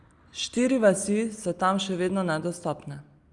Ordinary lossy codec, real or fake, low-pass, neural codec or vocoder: Opus, 32 kbps; real; 10.8 kHz; none